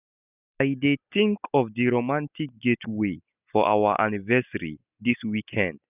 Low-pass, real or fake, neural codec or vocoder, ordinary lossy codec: 3.6 kHz; real; none; none